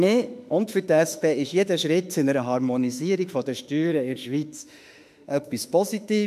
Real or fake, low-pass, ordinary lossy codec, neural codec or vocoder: fake; 14.4 kHz; none; autoencoder, 48 kHz, 32 numbers a frame, DAC-VAE, trained on Japanese speech